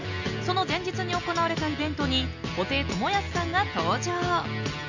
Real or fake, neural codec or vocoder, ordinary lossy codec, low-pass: real; none; none; 7.2 kHz